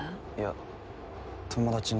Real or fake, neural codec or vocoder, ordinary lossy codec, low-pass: real; none; none; none